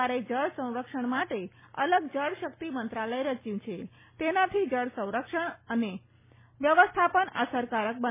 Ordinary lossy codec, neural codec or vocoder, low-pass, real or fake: MP3, 16 kbps; none; 3.6 kHz; real